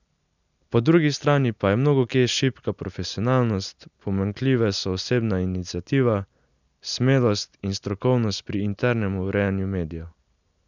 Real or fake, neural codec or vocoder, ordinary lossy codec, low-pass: real; none; none; 7.2 kHz